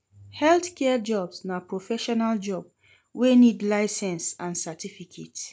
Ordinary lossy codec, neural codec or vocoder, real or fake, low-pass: none; none; real; none